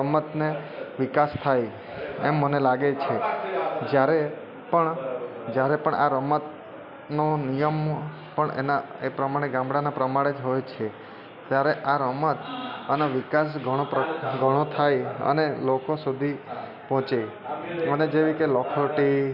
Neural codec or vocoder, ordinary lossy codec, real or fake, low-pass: none; none; real; 5.4 kHz